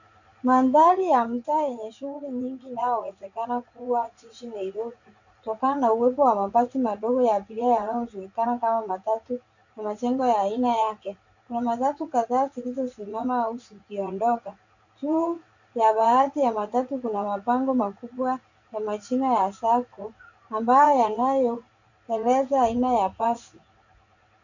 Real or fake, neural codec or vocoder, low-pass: fake; vocoder, 44.1 kHz, 80 mel bands, Vocos; 7.2 kHz